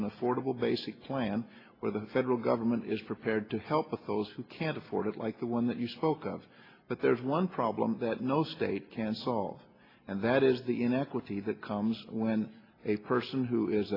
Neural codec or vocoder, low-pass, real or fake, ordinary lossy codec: none; 5.4 kHz; real; AAC, 24 kbps